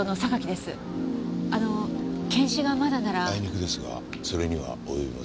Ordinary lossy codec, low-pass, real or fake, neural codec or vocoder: none; none; real; none